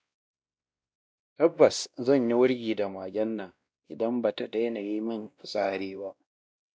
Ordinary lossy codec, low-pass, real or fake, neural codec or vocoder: none; none; fake; codec, 16 kHz, 1 kbps, X-Codec, WavLM features, trained on Multilingual LibriSpeech